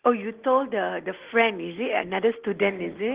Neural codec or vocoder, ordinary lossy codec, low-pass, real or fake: none; Opus, 16 kbps; 3.6 kHz; real